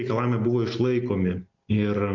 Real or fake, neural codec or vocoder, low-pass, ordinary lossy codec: real; none; 7.2 kHz; MP3, 48 kbps